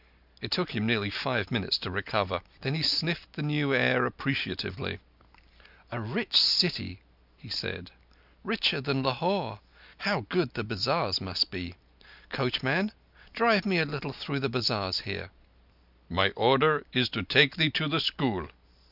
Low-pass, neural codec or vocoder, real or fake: 5.4 kHz; none; real